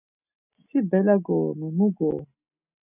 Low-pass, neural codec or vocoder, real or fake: 3.6 kHz; none; real